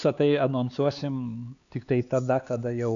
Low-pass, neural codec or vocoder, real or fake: 7.2 kHz; codec, 16 kHz, 4 kbps, X-Codec, HuBERT features, trained on LibriSpeech; fake